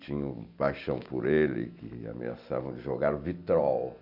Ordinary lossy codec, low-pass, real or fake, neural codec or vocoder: none; 5.4 kHz; real; none